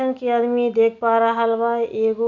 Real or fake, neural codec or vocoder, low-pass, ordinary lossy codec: real; none; 7.2 kHz; Opus, 64 kbps